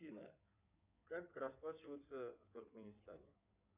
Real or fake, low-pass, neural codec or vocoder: fake; 3.6 kHz; codec, 16 kHz in and 24 kHz out, 2.2 kbps, FireRedTTS-2 codec